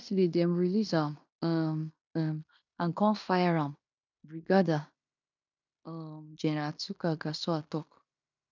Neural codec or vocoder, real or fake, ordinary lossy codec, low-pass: codec, 16 kHz in and 24 kHz out, 0.9 kbps, LongCat-Audio-Codec, fine tuned four codebook decoder; fake; none; 7.2 kHz